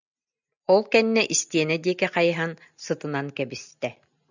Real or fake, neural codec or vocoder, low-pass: real; none; 7.2 kHz